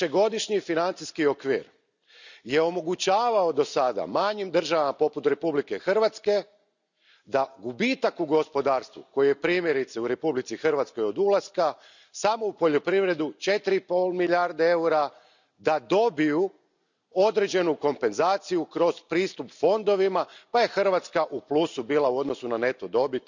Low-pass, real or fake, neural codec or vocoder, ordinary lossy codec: 7.2 kHz; real; none; none